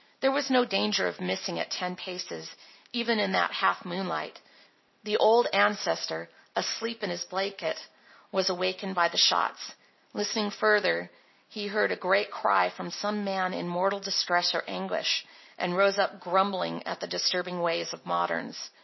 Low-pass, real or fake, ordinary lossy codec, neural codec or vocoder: 7.2 kHz; real; MP3, 24 kbps; none